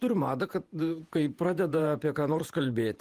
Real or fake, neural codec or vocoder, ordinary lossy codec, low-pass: fake; vocoder, 48 kHz, 128 mel bands, Vocos; Opus, 24 kbps; 14.4 kHz